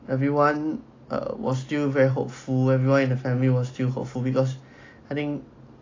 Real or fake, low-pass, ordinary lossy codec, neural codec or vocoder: real; 7.2 kHz; AAC, 32 kbps; none